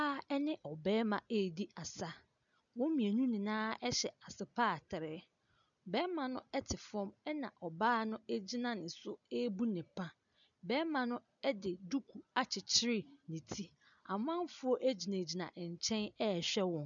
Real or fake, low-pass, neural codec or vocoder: real; 7.2 kHz; none